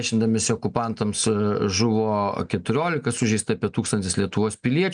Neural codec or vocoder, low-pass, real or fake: none; 9.9 kHz; real